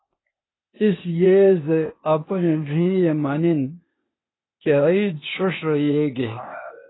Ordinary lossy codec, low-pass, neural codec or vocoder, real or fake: AAC, 16 kbps; 7.2 kHz; codec, 16 kHz, 0.8 kbps, ZipCodec; fake